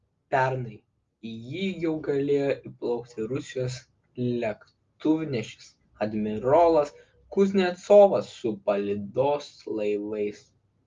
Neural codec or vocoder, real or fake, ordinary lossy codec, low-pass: none; real; Opus, 32 kbps; 7.2 kHz